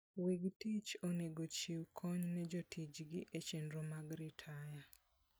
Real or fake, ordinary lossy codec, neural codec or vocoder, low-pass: real; none; none; none